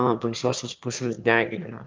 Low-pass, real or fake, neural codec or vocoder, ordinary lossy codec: 7.2 kHz; fake; autoencoder, 22.05 kHz, a latent of 192 numbers a frame, VITS, trained on one speaker; Opus, 24 kbps